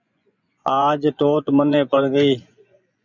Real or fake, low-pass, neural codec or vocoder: fake; 7.2 kHz; vocoder, 24 kHz, 100 mel bands, Vocos